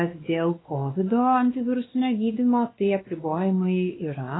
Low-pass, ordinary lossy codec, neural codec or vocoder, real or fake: 7.2 kHz; AAC, 16 kbps; codec, 16 kHz, 2 kbps, X-Codec, WavLM features, trained on Multilingual LibriSpeech; fake